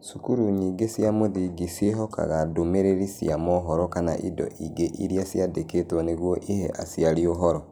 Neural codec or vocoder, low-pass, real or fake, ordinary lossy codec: none; none; real; none